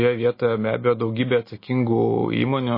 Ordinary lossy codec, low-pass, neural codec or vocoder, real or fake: MP3, 24 kbps; 5.4 kHz; none; real